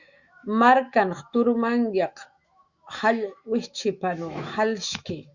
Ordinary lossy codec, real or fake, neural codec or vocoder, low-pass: Opus, 64 kbps; fake; autoencoder, 48 kHz, 128 numbers a frame, DAC-VAE, trained on Japanese speech; 7.2 kHz